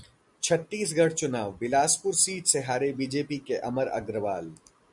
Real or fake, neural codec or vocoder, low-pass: real; none; 10.8 kHz